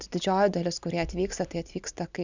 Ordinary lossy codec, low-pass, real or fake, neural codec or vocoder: Opus, 64 kbps; 7.2 kHz; real; none